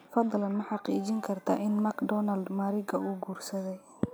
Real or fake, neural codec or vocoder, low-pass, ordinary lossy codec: real; none; none; none